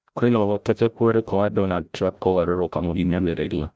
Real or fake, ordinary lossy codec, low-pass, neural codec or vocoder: fake; none; none; codec, 16 kHz, 0.5 kbps, FreqCodec, larger model